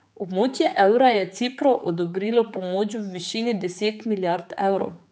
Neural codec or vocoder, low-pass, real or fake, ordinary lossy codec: codec, 16 kHz, 4 kbps, X-Codec, HuBERT features, trained on general audio; none; fake; none